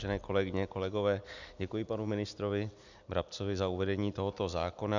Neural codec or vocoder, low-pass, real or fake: none; 7.2 kHz; real